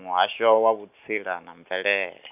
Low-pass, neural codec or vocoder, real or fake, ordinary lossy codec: 3.6 kHz; none; real; none